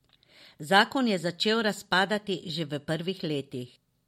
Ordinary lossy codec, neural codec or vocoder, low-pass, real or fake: MP3, 64 kbps; none; 19.8 kHz; real